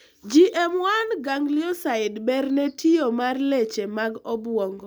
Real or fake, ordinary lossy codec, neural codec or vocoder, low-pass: real; none; none; none